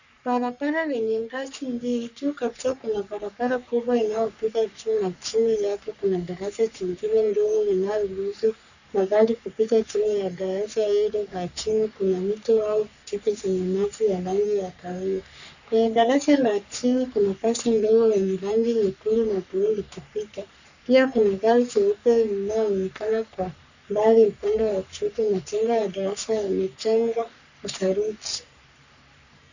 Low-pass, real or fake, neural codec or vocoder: 7.2 kHz; fake; codec, 44.1 kHz, 3.4 kbps, Pupu-Codec